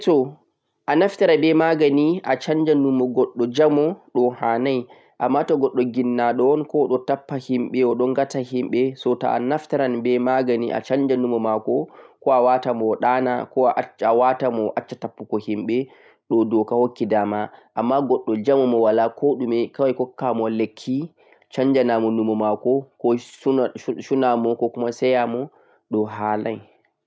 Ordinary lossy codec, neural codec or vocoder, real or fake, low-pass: none; none; real; none